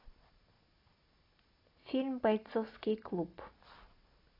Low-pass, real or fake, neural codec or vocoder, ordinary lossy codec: 5.4 kHz; real; none; AAC, 24 kbps